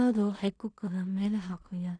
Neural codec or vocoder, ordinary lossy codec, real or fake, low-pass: codec, 16 kHz in and 24 kHz out, 0.4 kbps, LongCat-Audio-Codec, two codebook decoder; none; fake; 9.9 kHz